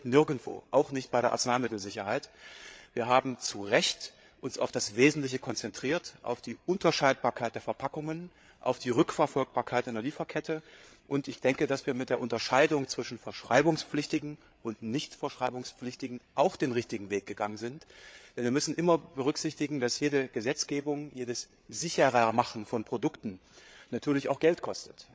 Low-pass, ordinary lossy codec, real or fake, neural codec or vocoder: none; none; fake; codec, 16 kHz, 8 kbps, FreqCodec, larger model